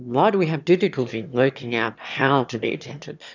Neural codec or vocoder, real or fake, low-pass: autoencoder, 22.05 kHz, a latent of 192 numbers a frame, VITS, trained on one speaker; fake; 7.2 kHz